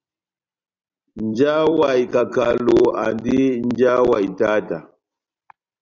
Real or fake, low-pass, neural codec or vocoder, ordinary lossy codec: fake; 7.2 kHz; vocoder, 44.1 kHz, 128 mel bands every 256 samples, BigVGAN v2; Opus, 64 kbps